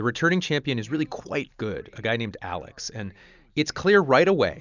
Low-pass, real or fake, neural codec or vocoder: 7.2 kHz; real; none